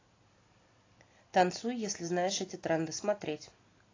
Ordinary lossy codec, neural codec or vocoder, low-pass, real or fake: AAC, 32 kbps; none; 7.2 kHz; real